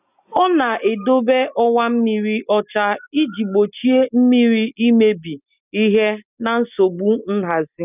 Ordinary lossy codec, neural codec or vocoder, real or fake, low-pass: none; none; real; 3.6 kHz